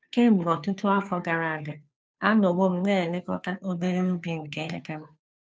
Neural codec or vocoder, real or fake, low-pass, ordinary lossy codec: codec, 16 kHz, 2 kbps, FunCodec, trained on Chinese and English, 25 frames a second; fake; none; none